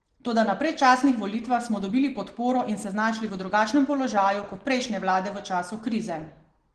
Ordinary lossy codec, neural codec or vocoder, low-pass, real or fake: Opus, 16 kbps; none; 9.9 kHz; real